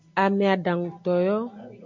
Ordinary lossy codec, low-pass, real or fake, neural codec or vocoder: MP3, 64 kbps; 7.2 kHz; real; none